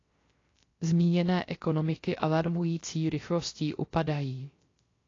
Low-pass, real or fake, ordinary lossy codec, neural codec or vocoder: 7.2 kHz; fake; AAC, 32 kbps; codec, 16 kHz, 0.3 kbps, FocalCodec